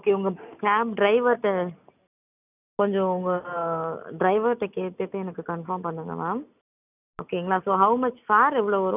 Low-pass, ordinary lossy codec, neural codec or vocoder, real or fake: 3.6 kHz; none; none; real